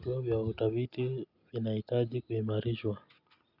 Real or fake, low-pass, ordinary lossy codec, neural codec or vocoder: real; 5.4 kHz; none; none